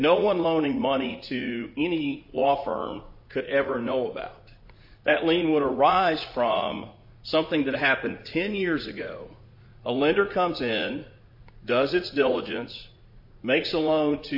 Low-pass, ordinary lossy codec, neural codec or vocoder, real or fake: 5.4 kHz; MP3, 32 kbps; vocoder, 44.1 kHz, 80 mel bands, Vocos; fake